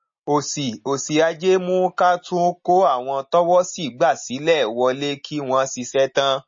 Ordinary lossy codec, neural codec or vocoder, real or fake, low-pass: MP3, 32 kbps; none; real; 7.2 kHz